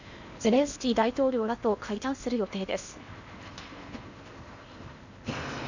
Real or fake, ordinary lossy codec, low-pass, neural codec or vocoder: fake; none; 7.2 kHz; codec, 16 kHz in and 24 kHz out, 0.8 kbps, FocalCodec, streaming, 65536 codes